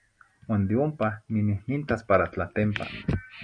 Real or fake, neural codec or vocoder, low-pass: real; none; 9.9 kHz